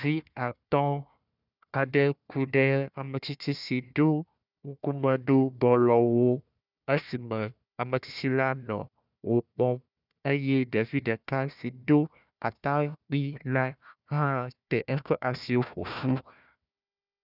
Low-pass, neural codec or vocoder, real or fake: 5.4 kHz; codec, 16 kHz, 1 kbps, FunCodec, trained on Chinese and English, 50 frames a second; fake